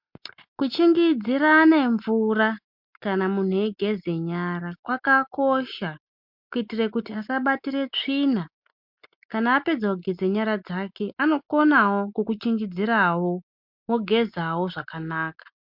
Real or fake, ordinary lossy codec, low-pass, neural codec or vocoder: real; MP3, 48 kbps; 5.4 kHz; none